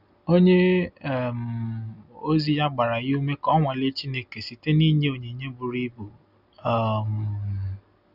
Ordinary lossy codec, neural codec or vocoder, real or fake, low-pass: none; none; real; 5.4 kHz